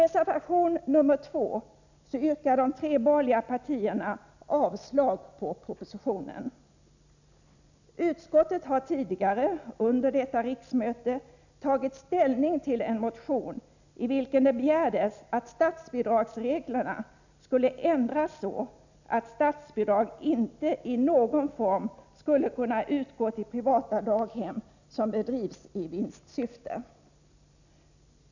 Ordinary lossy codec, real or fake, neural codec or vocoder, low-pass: none; real; none; 7.2 kHz